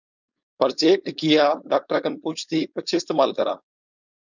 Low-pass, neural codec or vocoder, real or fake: 7.2 kHz; codec, 16 kHz, 4.8 kbps, FACodec; fake